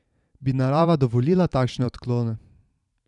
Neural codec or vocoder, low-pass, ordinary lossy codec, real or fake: vocoder, 44.1 kHz, 128 mel bands every 512 samples, BigVGAN v2; 10.8 kHz; none; fake